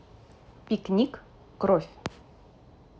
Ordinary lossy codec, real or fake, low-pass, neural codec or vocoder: none; real; none; none